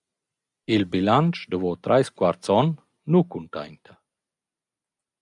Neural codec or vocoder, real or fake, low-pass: none; real; 10.8 kHz